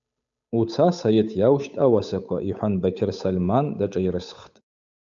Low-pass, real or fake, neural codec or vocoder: 7.2 kHz; fake; codec, 16 kHz, 8 kbps, FunCodec, trained on Chinese and English, 25 frames a second